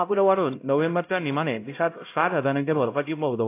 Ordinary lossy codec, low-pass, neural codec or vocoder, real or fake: AAC, 24 kbps; 3.6 kHz; codec, 16 kHz, 0.5 kbps, X-Codec, HuBERT features, trained on LibriSpeech; fake